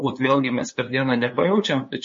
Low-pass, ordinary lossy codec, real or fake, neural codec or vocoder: 7.2 kHz; MP3, 32 kbps; fake; codec, 16 kHz, 2 kbps, FunCodec, trained on LibriTTS, 25 frames a second